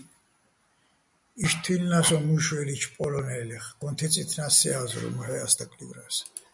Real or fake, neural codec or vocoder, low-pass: real; none; 10.8 kHz